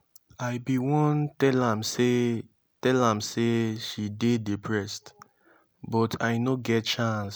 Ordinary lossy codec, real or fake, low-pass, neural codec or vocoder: none; real; none; none